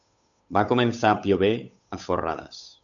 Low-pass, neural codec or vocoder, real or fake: 7.2 kHz; codec, 16 kHz, 8 kbps, FunCodec, trained on Chinese and English, 25 frames a second; fake